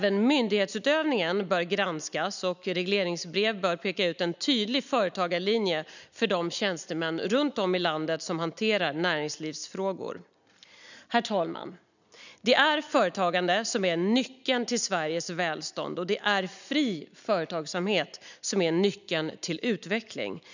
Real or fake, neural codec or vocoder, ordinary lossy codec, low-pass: real; none; none; 7.2 kHz